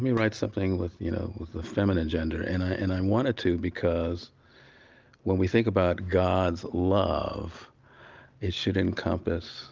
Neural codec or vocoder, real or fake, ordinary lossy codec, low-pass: none; real; Opus, 24 kbps; 7.2 kHz